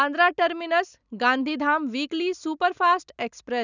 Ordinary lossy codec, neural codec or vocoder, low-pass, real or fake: none; none; 7.2 kHz; real